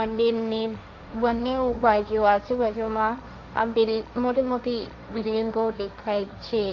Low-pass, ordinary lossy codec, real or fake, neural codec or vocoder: 7.2 kHz; AAC, 48 kbps; fake; codec, 16 kHz, 1.1 kbps, Voila-Tokenizer